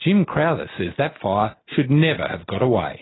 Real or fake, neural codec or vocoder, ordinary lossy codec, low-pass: real; none; AAC, 16 kbps; 7.2 kHz